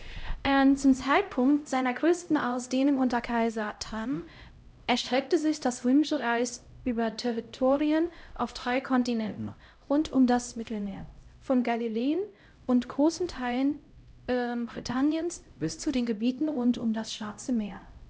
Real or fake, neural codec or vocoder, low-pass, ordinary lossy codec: fake; codec, 16 kHz, 0.5 kbps, X-Codec, HuBERT features, trained on LibriSpeech; none; none